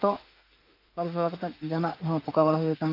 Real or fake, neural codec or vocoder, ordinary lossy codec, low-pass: fake; autoencoder, 48 kHz, 32 numbers a frame, DAC-VAE, trained on Japanese speech; Opus, 32 kbps; 5.4 kHz